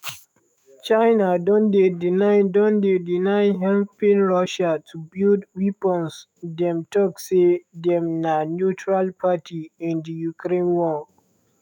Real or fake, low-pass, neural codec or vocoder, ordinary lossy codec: fake; 19.8 kHz; autoencoder, 48 kHz, 128 numbers a frame, DAC-VAE, trained on Japanese speech; none